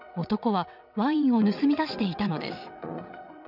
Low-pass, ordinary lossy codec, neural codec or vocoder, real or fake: 5.4 kHz; none; vocoder, 22.05 kHz, 80 mel bands, WaveNeXt; fake